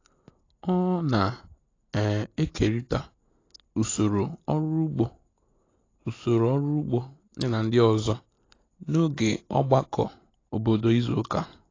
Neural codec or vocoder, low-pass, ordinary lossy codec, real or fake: none; 7.2 kHz; AAC, 32 kbps; real